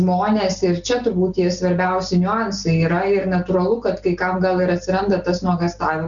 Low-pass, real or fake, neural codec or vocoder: 7.2 kHz; real; none